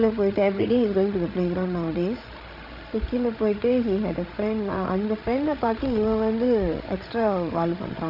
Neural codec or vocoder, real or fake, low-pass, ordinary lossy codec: codec, 16 kHz, 16 kbps, FreqCodec, larger model; fake; 5.4 kHz; none